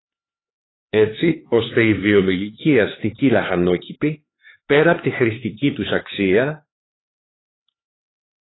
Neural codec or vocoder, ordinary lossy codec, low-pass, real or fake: codec, 16 kHz, 4 kbps, X-Codec, HuBERT features, trained on LibriSpeech; AAC, 16 kbps; 7.2 kHz; fake